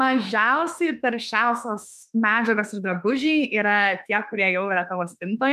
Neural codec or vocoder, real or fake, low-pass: autoencoder, 48 kHz, 32 numbers a frame, DAC-VAE, trained on Japanese speech; fake; 14.4 kHz